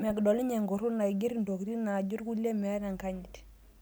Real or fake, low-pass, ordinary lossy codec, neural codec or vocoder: real; none; none; none